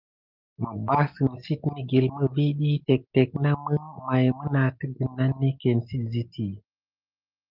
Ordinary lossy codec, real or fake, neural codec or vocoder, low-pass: Opus, 32 kbps; real; none; 5.4 kHz